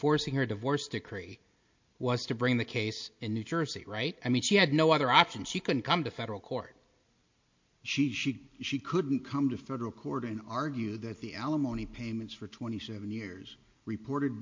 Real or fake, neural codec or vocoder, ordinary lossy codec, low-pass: real; none; MP3, 48 kbps; 7.2 kHz